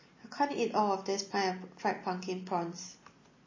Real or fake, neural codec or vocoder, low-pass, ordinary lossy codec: real; none; 7.2 kHz; MP3, 32 kbps